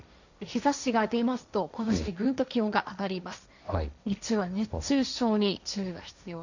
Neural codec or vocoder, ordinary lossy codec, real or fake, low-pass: codec, 16 kHz, 1.1 kbps, Voila-Tokenizer; none; fake; 7.2 kHz